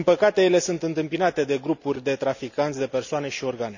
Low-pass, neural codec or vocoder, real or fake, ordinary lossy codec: 7.2 kHz; none; real; none